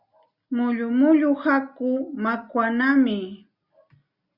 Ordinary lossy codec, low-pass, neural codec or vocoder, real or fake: Opus, 64 kbps; 5.4 kHz; none; real